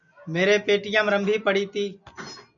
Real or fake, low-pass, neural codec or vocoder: real; 7.2 kHz; none